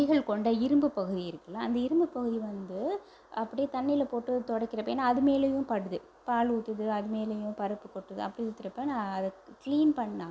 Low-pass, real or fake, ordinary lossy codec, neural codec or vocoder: none; real; none; none